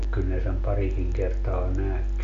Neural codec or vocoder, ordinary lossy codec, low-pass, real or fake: none; none; 7.2 kHz; real